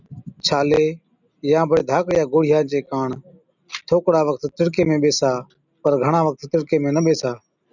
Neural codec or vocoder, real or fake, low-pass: none; real; 7.2 kHz